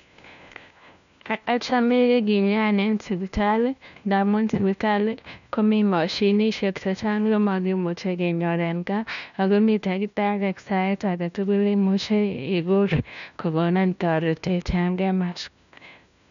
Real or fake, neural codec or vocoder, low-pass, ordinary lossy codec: fake; codec, 16 kHz, 1 kbps, FunCodec, trained on LibriTTS, 50 frames a second; 7.2 kHz; none